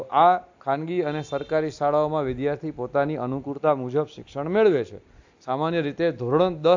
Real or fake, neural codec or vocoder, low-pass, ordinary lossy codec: real; none; 7.2 kHz; AAC, 48 kbps